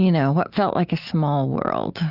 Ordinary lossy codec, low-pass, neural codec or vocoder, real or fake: Opus, 64 kbps; 5.4 kHz; none; real